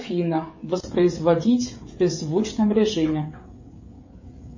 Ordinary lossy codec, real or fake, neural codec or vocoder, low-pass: MP3, 32 kbps; fake; codec, 16 kHz, 16 kbps, FreqCodec, smaller model; 7.2 kHz